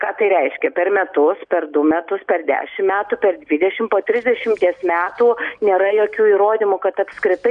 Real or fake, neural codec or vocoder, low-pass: real; none; 9.9 kHz